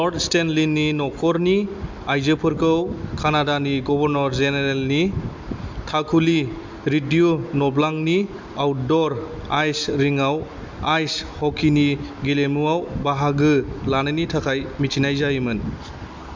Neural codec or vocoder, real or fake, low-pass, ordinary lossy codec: none; real; 7.2 kHz; MP3, 64 kbps